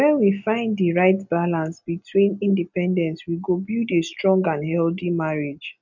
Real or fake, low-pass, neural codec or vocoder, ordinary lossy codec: real; 7.2 kHz; none; none